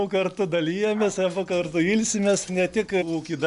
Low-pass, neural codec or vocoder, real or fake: 10.8 kHz; none; real